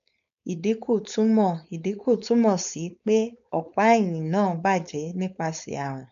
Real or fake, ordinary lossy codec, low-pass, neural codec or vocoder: fake; none; 7.2 kHz; codec, 16 kHz, 4.8 kbps, FACodec